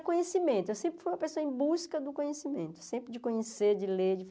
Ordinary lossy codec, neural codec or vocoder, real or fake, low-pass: none; none; real; none